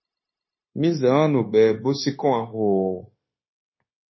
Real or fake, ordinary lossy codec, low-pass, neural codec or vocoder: fake; MP3, 24 kbps; 7.2 kHz; codec, 16 kHz, 0.9 kbps, LongCat-Audio-Codec